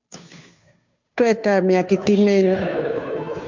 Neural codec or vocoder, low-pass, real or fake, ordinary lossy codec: codec, 16 kHz, 2 kbps, FunCodec, trained on Chinese and English, 25 frames a second; 7.2 kHz; fake; MP3, 64 kbps